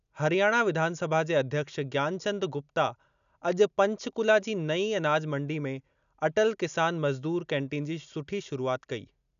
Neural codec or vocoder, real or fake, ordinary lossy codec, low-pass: none; real; none; 7.2 kHz